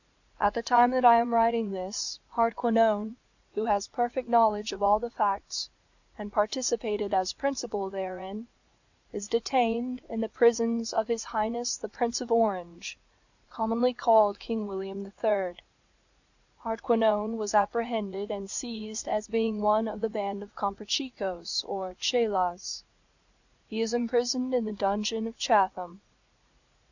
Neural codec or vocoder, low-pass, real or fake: vocoder, 44.1 kHz, 80 mel bands, Vocos; 7.2 kHz; fake